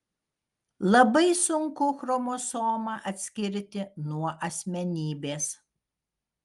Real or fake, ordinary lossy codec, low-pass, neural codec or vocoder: real; Opus, 32 kbps; 10.8 kHz; none